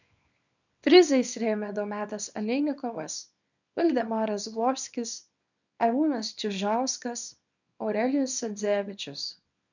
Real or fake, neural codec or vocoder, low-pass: fake; codec, 24 kHz, 0.9 kbps, WavTokenizer, small release; 7.2 kHz